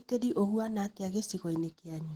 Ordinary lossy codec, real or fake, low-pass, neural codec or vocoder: Opus, 16 kbps; real; 19.8 kHz; none